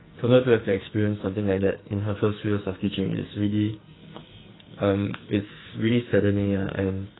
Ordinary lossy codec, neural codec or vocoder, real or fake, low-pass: AAC, 16 kbps; codec, 44.1 kHz, 2.6 kbps, SNAC; fake; 7.2 kHz